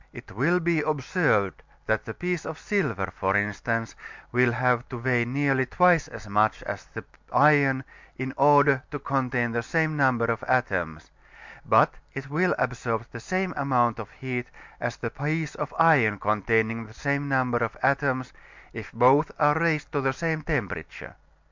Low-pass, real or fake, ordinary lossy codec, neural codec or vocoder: 7.2 kHz; real; MP3, 64 kbps; none